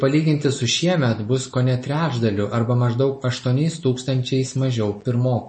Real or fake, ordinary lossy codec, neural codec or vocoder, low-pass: real; MP3, 32 kbps; none; 9.9 kHz